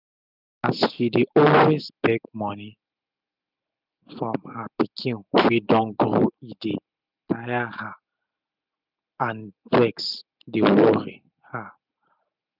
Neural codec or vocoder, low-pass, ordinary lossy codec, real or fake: none; 5.4 kHz; none; real